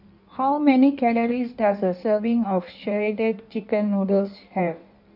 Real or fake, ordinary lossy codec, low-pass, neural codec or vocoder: fake; none; 5.4 kHz; codec, 16 kHz in and 24 kHz out, 1.1 kbps, FireRedTTS-2 codec